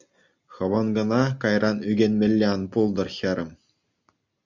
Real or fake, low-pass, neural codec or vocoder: real; 7.2 kHz; none